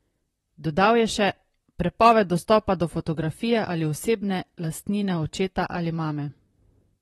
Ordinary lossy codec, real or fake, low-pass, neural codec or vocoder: AAC, 32 kbps; fake; 19.8 kHz; vocoder, 44.1 kHz, 128 mel bands, Pupu-Vocoder